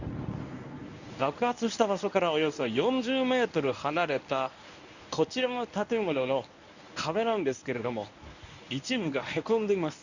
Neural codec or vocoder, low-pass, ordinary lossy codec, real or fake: codec, 24 kHz, 0.9 kbps, WavTokenizer, medium speech release version 1; 7.2 kHz; none; fake